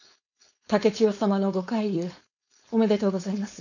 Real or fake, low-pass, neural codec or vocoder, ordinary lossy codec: fake; 7.2 kHz; codec, 16 kHz, 4.8 kbps, FACodec; none